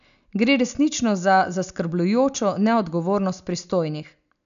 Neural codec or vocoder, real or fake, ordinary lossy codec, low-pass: none; real; none; 7.2 kHz